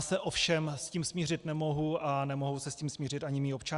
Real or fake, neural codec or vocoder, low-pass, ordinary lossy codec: real; none; 10.8 kHz; Opus, 64 kbps